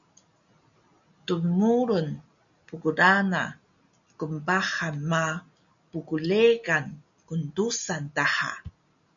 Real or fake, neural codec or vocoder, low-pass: real; none; 7.2 kHz